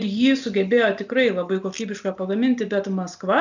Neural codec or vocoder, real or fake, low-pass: none; real; 7.2 kHz